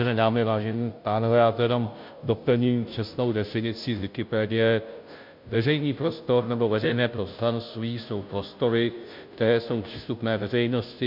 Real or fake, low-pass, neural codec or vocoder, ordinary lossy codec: fake; 5.4 kHz; codec, 16 kHz, 0.5 kbps, FunCodec, trained on Chinese and English, 25 frames a second; MP3, 48 kbps